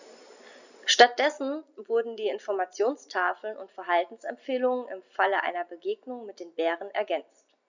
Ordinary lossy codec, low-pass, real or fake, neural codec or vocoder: none; 7.2 kHz; real; none